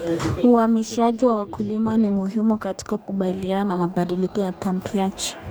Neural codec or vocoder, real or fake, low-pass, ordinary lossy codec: codec, 44.1 kHz, 2.6 kbps, DAC; fake; none; none